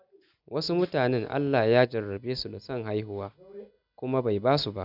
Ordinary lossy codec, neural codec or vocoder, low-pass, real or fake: none; none; 5.4 kHz; real